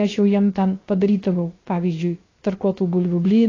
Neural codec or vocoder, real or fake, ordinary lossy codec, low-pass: codec, 16 kHz, about 1 kbps, DyCAST, with the encoder's durations; fake; AAC, 32 kbps; 7.2 kHz